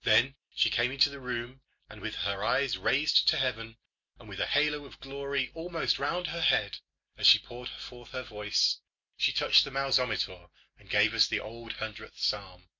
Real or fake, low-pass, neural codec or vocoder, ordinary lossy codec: real; 7.2 kHz; none; MP3, 48 kbps